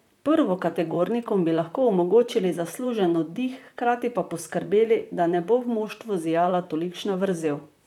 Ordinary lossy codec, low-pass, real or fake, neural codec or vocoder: none; 19.8 kHz; fake; vocoder, 44.1 kHz, 128 mel bands, Pupu-Vocoder